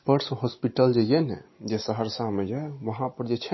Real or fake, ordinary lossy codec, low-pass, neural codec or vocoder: real; MP3, 24 kbps; 7.2 kHz; none